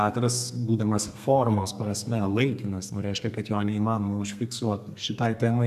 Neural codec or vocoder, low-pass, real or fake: codec, 32 kHz, 1.9 kbps, SNAC; 14.4 kHz; fake